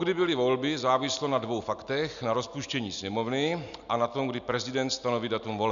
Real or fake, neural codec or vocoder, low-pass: real; none; 7.2 kHz